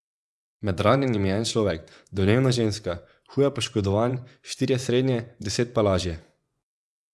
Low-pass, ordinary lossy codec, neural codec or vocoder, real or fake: none; none; vocoder, 24 kHz, 100 mel bands, Vocos; fake